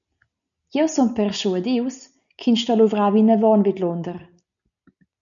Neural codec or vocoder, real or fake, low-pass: none; real; 7.2 kHz